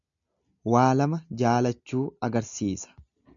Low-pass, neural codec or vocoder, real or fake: 7.2 kHz; none; real